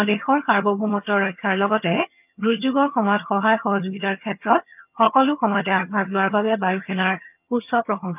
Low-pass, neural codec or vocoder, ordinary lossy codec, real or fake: 3.6 kHz; vocoder, 22.05 kHz, 80 mel bands, HiFi-GAN; none; fake